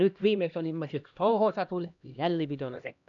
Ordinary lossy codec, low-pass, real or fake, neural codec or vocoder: none; 7.2 kHz; fake; codec, 16 kHz, 1 kbps, X-Codec, HuBERT features, trained on LibriSpeech